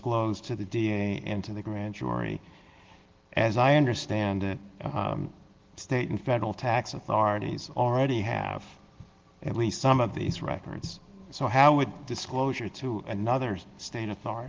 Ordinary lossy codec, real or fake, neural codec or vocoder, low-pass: Opus, 16 kbps; real; none; 7.2 kHz